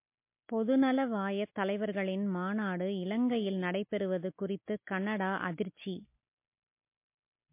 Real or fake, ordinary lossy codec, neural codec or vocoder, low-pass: real; MP3, 24 kbps; none; 3.6 kHz